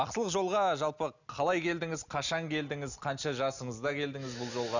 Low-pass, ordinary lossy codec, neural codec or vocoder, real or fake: 7.2 kHz; none; none; real